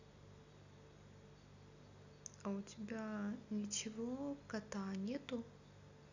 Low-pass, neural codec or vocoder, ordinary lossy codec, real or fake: 7.2 kHz; none; none; real